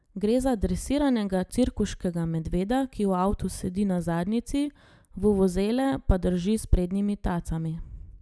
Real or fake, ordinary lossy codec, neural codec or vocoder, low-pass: real; none; none; none